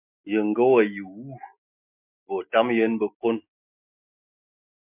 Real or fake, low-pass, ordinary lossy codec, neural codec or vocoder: real; 3.6 kHz; MP3, 32 kbps; none